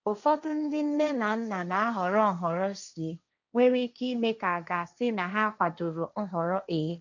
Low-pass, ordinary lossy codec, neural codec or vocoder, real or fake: 7.2 kHz; none; codec, 16 kHz, 1.1 kbps, Voila-Tokenizer; fake